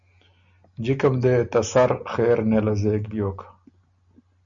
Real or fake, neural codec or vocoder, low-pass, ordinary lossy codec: real; none; 7.2 kHz; Opus, 64 kbps